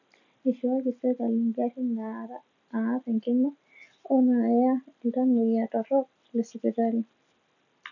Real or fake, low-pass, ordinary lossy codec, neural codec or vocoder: real; 7.2 kHz; none; none